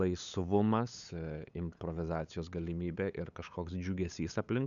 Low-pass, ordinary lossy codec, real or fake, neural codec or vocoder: 7.2 kHz; MP3, 96 kbps; fake; codec, 16 kHz, 8 kbps, FunCodec, trained on LibriTTS, 25 frames a second